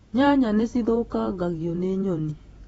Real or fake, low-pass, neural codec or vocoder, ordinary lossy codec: fake; 19.8 kHz; vocoder, 48 kHz, 128 mel bands, Vocos; AAC, 24 kbps